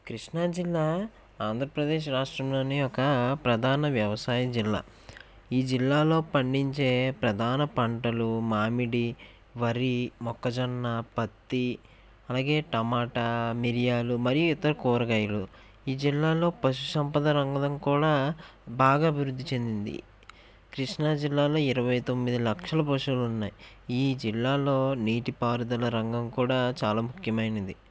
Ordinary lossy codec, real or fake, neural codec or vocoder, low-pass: none; real; none; none